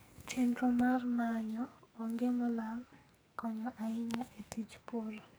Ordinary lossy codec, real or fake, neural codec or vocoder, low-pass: none; fake; codec, 44.1 kHz, 2.6 kbps, SNAC; none